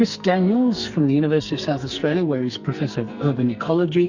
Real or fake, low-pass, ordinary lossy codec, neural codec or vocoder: fake; 7.2 kHz; Opus, 64 kbps; codec, 44.1 kHz, 2.6 kbps, SNAC